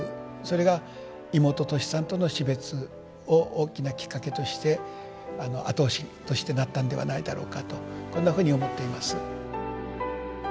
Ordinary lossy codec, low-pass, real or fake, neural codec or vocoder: none; none; real; none